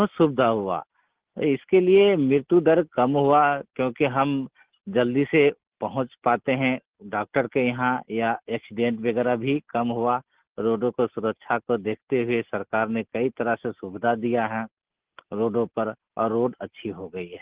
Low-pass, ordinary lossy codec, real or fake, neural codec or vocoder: 3.6 kHz; Opus, 16 kbps; real; none